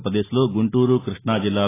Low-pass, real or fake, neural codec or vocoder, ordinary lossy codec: 3.6 kHz; real; none; AAC, 16 kbps